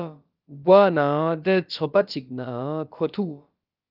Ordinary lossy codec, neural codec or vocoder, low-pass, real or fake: Opus, 24 kbps; codec, 16 kHz, about 1 kbps, DyCAST, with the encoder's durations; 5.4 kHz; fake